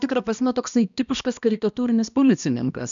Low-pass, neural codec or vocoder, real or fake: 7.2 kHz; codec, 16 kHz, 1 kbps, X-Codec, HuBERT features, trained on balanced general audio; fake